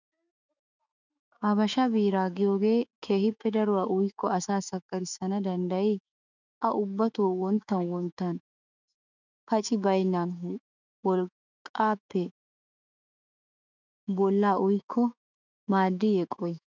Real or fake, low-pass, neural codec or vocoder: fake; 7.2 kHz; autoencoder, 48 kHz, 128 numbers a frame, DAC-VAE, trained on Japanese speech